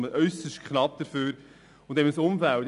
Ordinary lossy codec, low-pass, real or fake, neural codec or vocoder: none; 10.8 kHz; real; none